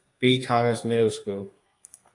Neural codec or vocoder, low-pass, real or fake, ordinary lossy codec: codec, 32 kHz, 1.9 kbps, SNAC; 10.8 kHz; fake; AAC, 48 kbps